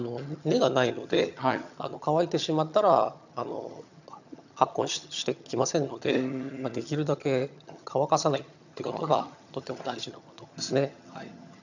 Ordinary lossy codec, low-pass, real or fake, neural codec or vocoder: none; 7.2 kHz; fake; vocoder, 22.05 kHz, 80 mel bands, HiFi-GAN